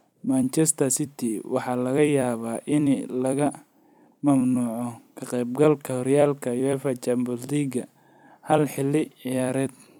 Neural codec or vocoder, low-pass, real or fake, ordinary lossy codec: vocoder, 44.1 kHz, 128 mel bands every 256 samples, BigVGAN v2; 19.8 kHz; fake; none